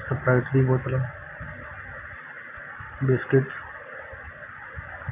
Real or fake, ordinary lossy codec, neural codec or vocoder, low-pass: real; AAC, 24 kbps; none; 3.6 kHz